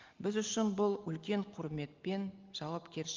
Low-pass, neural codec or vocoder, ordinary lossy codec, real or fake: 7.2 kHz; none; Opus, 24 kbps; real